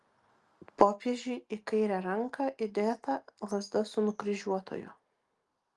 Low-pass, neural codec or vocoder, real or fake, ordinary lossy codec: 10.8 kHz; none; real; Opus, 32 kbps